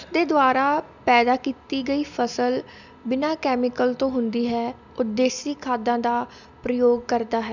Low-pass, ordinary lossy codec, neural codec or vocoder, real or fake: 7.2 kHz; none; none; real